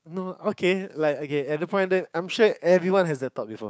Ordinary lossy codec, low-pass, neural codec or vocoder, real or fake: none; none; codec, 16 kHz, 6 kbps, DAC; fake